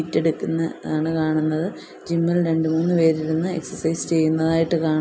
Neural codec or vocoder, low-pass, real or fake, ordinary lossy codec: none; none; real; none